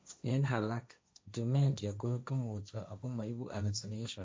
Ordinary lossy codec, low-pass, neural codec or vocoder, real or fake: none; none; codec, 16 kHz, 1.1 kbps, Voila-Tokenizer; fake